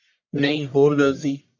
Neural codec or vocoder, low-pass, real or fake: codec, 44.1 kHz, 1.7 kbps, Pupu-Codec; 7.2 kHz; fake